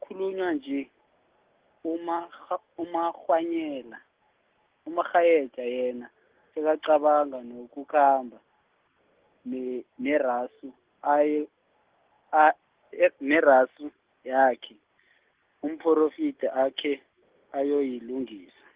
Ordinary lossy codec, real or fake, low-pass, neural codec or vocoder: Opus, 16 kbps; real; 3.6 kHz; none